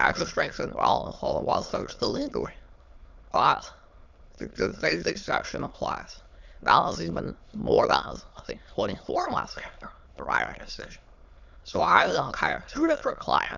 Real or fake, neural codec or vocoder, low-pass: fake; autoencoder, 22.05 kHz, a latent of 192 numbers a frame, VITS, trained on many speakers; 7.2 kHz